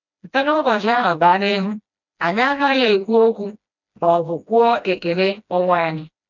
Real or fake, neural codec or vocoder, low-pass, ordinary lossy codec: fake; codec, 16 kHz, 1 kbps, FreqCodec, smaller model; 7.2 kHz; none